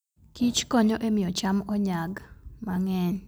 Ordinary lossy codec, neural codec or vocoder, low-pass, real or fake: none; none; none; real